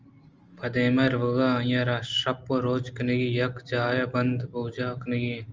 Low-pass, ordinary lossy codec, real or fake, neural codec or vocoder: 7.2 kHz; Opus, 24 kbps; real; none